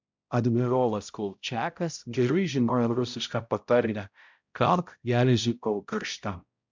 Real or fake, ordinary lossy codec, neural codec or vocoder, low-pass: fake; MP3, 64 kbps; codec, 16 kHz, 0.5 kbps, X-Codec, HuBERT features, trained on balanced general audio; 7.2 kHz